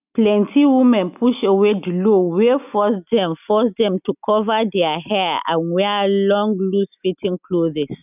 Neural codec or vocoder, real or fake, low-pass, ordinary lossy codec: none; real; 3.6 kHz; none